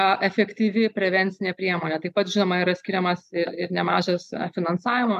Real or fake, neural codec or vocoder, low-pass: real; none; 14.4 kHz